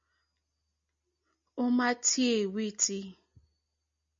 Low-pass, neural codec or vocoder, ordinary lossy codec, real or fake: 7.2 kHz; none; MP3, 48 kbps; real